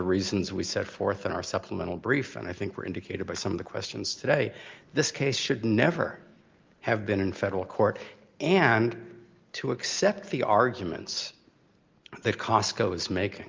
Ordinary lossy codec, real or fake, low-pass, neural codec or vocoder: Opus, 32 kbps; real; 7.2 kHz; none